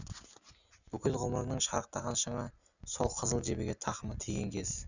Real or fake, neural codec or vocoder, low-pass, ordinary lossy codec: real; none; 7.2 kHz; none